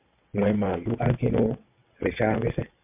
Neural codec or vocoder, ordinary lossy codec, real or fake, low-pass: vocoder, 22.05 kHz, 80 mel bands, WaveNeXt; MP3, 32 kbps; fake; 3.6 kHz